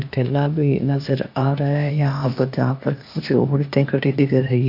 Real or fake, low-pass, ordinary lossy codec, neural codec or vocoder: fake; 5.4 kHz; MP3, 48 kbps; codec, 16 kHz, 0.8 kbps, ZipCodec